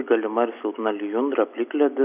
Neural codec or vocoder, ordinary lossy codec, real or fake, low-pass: none; AAC, 32 kbps; real; 3.6 kHz